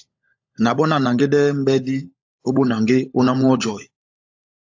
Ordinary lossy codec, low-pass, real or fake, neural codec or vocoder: AAC, 48 kbps; 7.2 kHz; fake; codec, 16 kHz, 16 kbps, FunCodec, trained on LibriTTS, 50 frames a second